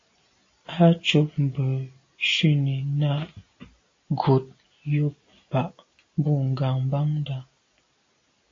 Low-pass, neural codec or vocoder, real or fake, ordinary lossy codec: 7.2 kHz; none; real; AAC, 32 kbps